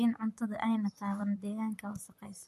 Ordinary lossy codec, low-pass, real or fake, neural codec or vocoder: MP3, 64 kbps; 14.4 kHz; fake; vocoder, 44.1 kHz, 128 mel bands, Pupu-Vocoder